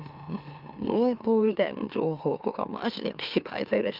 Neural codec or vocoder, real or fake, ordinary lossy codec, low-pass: autoencoder, 44.1 kHz, a latent of 192 numbers a frame, MeloTTS; fake; Opus, 24 kbps; 5.4 kHz